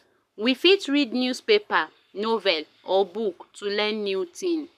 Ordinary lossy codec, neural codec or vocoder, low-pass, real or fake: none; codec, 44.1 kHz, 7.8 kbps, Pupu-Codec; 14.4 kHz; fake